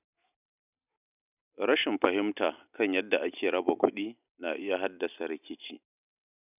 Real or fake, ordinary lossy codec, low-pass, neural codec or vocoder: real; none; 3.6 kHz; none